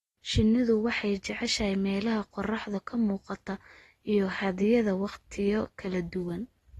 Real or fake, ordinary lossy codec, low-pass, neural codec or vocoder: real; AAC, 32 kbps; 19.8 kHz; none